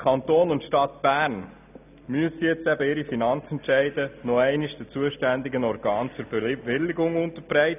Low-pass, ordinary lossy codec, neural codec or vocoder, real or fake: 3.6 kHz; none; none; real